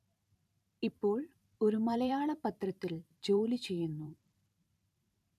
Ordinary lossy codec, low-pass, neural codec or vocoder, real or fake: none; 14.4 kHz; vocoder, 44.1 kHz, 128 mel bands every 512 samples, BigVGAN v2; fake